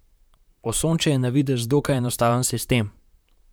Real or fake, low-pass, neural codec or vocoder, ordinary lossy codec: fake; none; vocoder, 44.1 kHz, 128 mel bands, Pupu-Vocoder; none